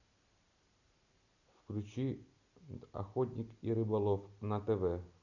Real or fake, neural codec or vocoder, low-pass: real; none; 7.2 kHz